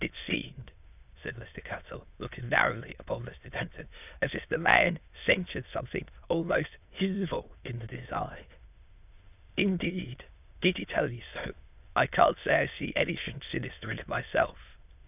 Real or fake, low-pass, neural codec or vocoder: fake; 3.6 kHz; autoencoder, 22.05 kHz, a latent of 192 numbers a frame, VITS, trained on many speakers